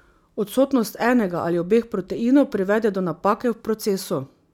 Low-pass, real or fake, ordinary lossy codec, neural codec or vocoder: 19.8 kHz; real; none; none